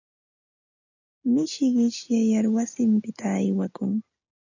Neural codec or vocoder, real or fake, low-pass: none; real; 7.2 kHz